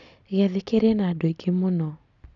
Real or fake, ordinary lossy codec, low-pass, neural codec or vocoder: real; none; 7.2 kHz; none